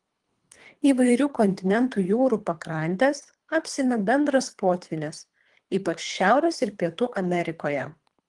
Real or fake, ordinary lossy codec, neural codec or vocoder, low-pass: fake; Opus, 24 kbps; codec, 24 kHz, 3 kbps, HILCodec; 10.8 kHz